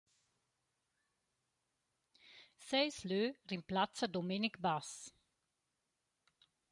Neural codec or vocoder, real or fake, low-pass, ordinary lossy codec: none; real; 10.8 kHz; MP3, 96 kbps